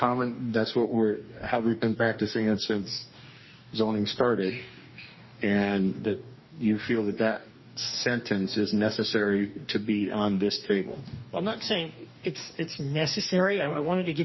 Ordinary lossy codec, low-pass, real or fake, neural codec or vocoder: MP3, 24 kbps; 7.2 kHz; fake; codec, 44.1 kHz, 2.6 kbps, DAC